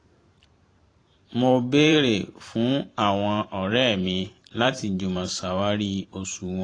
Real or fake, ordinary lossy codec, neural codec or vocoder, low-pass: fake; AAC, 32 kbps; vocoder, 44.1 kHz, 128 mel bands every 512 samples, BigVGAN v2; 9.9 kHz